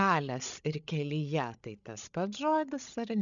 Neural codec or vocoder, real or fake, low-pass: codec, 16 kHz, 8 kbps, FreqCodec, larger model; fake; 7.2 kHz